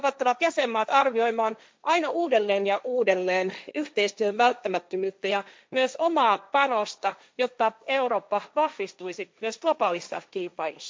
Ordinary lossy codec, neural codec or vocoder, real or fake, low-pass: none; codec, 16 kHz, 1.1 kbps, Voila-Tokenizer; fake; none